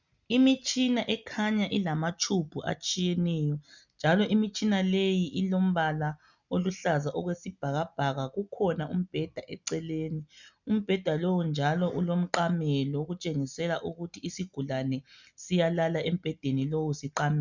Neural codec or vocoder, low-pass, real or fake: none; 7.2 kHz; real